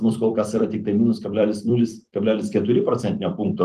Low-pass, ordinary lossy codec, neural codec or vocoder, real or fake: 14.4 kHz; Opus, 24 kbps; vocoder, 44.1 kHz, 128 mel bands every 256 samples, BigVGAN v2; fake